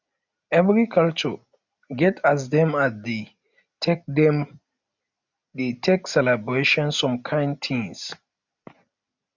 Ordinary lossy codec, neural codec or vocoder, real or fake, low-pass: none; none; real; 7.2 kHz